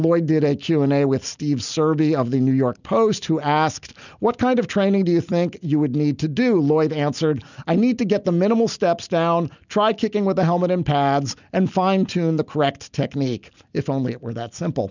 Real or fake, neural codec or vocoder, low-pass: real; none; 7.2 kHz